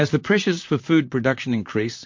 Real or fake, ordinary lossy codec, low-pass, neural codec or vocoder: fake; MP3, 48 kbps; 7.2 kHz; vocoder, 44.1 kHz, 128 mel bands, Pupu-Vocoder